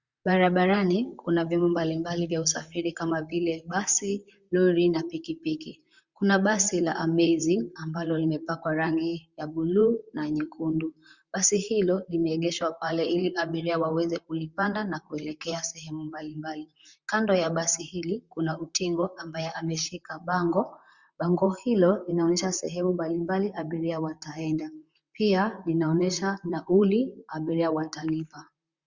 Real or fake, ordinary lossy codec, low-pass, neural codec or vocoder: fake; Opus, 64 kbps; 7.2 kHz; vocoder, 44.1 kHz, 128 mel bands, Pupu-Vocoder